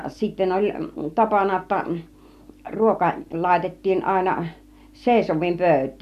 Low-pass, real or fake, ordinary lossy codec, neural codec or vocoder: 14.4 kHz; real; MP3, 96 kbps; none